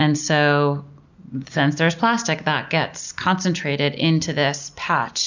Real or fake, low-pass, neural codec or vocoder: real; 7.2 kHz; none